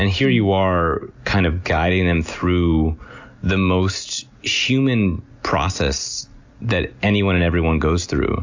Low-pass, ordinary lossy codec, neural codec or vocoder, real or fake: 7.2 kHz; AAC, 48 kbps; none; real